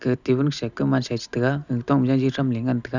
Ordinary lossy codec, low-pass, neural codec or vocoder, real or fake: none; 7.2 kHz; none; real